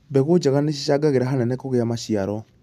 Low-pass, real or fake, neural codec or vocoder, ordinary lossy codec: 14.4 kHz; real; none; none